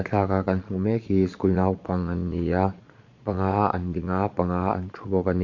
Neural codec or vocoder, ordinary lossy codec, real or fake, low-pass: vocoder, 22.05 kHz, 80 mel bands, Vocos; MP3, 48 kbps; fake; 7.2 kHz